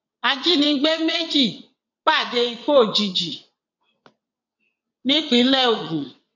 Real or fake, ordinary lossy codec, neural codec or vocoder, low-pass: fake; none; vocoder, 22.05 kHz, 80 mel bands, WaveNeXt; 7.2 kHz